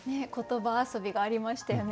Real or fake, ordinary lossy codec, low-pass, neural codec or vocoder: real; none; none; none